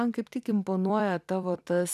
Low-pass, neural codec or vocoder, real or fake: 14.4 kHz; vocoder, 44.1 kHz, 128 mel bands every 256 samples, BigVGAN v2; fake